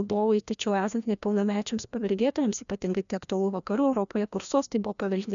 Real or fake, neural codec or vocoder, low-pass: fake; codec, 16 kHz, 1 kbps, FreqCodec, larger model; 7.2 kHz